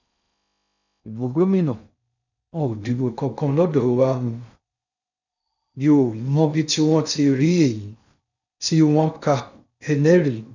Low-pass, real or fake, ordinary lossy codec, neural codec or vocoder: 7.2 kHz; fake; none; codec, 16 kHz in and 24 kHz out, 0.6 kbps, FocalCodec, streaming, 4096 codes